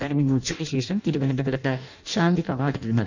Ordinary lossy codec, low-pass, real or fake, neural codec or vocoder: none; 7.2 kHz; fake; codec, 16 kHz in and 24 kHz out, 0.6 kbps, FireRedTTS-2 codec